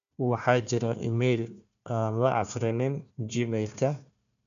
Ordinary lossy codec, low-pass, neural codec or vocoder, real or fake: none; 7.2 kHz; codec, 16 kHz, 1 kbps, FunCodec, trained on Chinese and English, 50 frames a second; fake